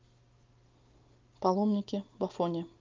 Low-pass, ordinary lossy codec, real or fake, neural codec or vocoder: 7.2 kHz; Opus, 32 kbps; real; none